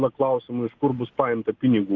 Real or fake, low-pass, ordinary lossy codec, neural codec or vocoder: real; 7.2 kHz; Opus, 32 kbps; none